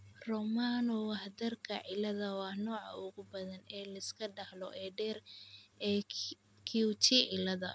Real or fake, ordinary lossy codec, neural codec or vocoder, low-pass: real; none; none; none